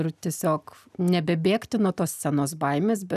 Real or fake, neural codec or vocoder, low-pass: real; none; 14.4 kHz